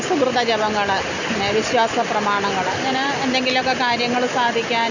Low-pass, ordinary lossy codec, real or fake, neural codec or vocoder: 7.2 kHz; none; real; none